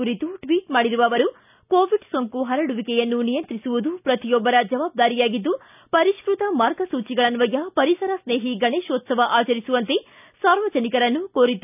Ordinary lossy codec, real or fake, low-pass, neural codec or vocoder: none; real; 3.6 kHz; none